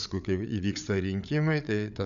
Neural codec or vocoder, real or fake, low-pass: codec, 16 kHz, 8 kbps, FreqCodec, larger model; fake; 7.2 kHz